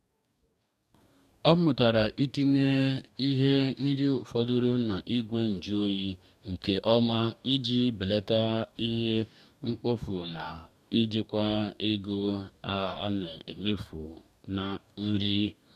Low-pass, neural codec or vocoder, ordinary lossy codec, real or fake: 14.4 kHz; codec, 44.1 kHz, 2.6 kbps, DAC; none; fake